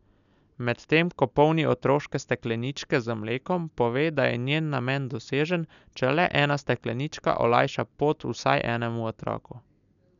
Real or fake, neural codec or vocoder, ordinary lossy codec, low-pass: real; none; none; 7.2 kHz